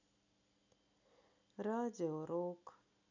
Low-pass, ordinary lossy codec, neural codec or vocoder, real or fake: 7.2 kHz; none; none; real